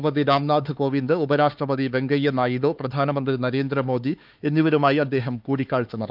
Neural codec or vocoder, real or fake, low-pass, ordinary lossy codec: codec, 16 kHz, 4 kbps, X-Codec, HuBERT features, trained on LibriSpeech; fake; 5.4 kHz; Opus, 32 kbps